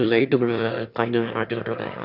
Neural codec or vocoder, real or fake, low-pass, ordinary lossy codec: autoencoder, 22.05 kHz, a latent of 192 numbers a frame, VITS, trained on one speaker; fake; 5.4 kHz; none